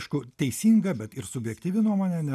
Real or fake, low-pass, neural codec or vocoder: real; 14.4 kHz; none